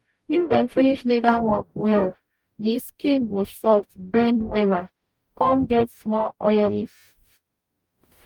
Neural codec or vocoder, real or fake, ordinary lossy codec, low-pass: codec, 44.1 kHz, 0.9 kbps, DAC; fake; Opus, 32 kbps; 19.8 kHz